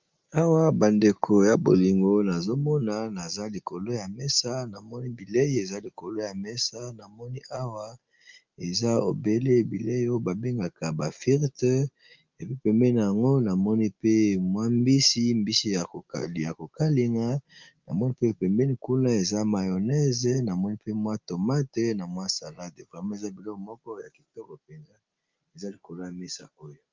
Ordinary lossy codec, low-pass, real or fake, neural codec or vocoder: Opus, 24 kbps; 7.2 kHz; real; none